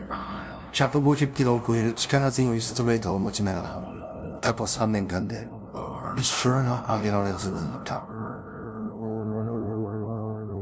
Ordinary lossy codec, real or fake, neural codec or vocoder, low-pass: none; fake; codec, 16 kHz, 0.5 kbps, FunCodec, trained on LibriTTS, 25 frames a second; none